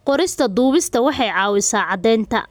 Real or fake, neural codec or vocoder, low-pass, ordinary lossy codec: real; none; none; none